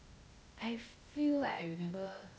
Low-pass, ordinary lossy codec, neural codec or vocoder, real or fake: none; none; codec, 16 kHz, 0.8 kbps, ZipCodec; fake